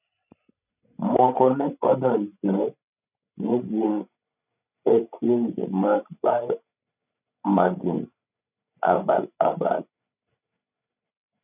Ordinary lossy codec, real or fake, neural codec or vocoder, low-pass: none; fake; codec, 16 kHz, 16 kbps, FreqCodec, larger model; 3.6 kHz